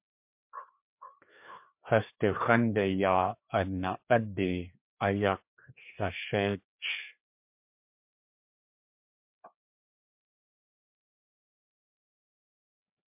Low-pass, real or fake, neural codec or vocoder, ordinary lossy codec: 3.6 kHz; fake; codec, 16 kHz, 2 kbps, FreqCodec, larger model; MP3, 32 kbps